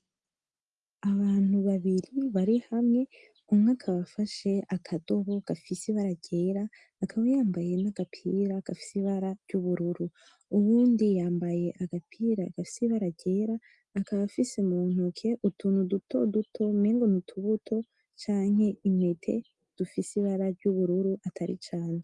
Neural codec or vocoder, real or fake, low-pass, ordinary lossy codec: none; real; 10.8 kHz; Opus, 32 kbps